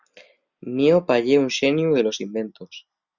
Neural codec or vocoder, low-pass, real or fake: none; 7.2 kHz; real